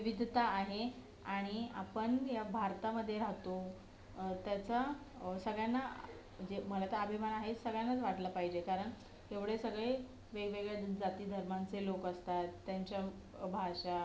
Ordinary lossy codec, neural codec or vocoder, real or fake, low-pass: none; none; real; none